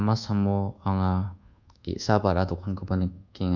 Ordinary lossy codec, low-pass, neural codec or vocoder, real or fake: none; 7.2 kHz; codec, 24 kHz, 1.2 kbps, DualCodec; fake